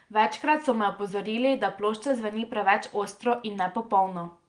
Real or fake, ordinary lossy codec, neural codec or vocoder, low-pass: real; Opus, 24 kbps; none; 9.9 kHz